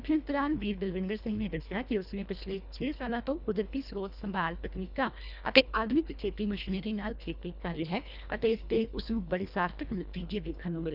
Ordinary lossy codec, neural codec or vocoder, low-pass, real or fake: none; codec, 24 kHz, 1.5 kbps, HILCodec; 5.4 kHz; fake